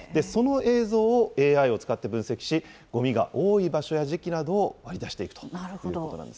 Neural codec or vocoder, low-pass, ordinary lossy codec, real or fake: none; none; none; real